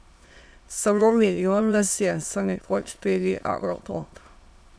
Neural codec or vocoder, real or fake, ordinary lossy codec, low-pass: autoencoder, 22.05 kHz, a latent of 192 numbers a frame, VITS, trained on many speakers; fake; none; none